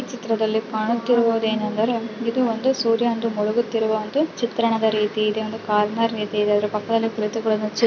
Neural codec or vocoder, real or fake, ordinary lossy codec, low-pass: none; real; none; 7.2 kHz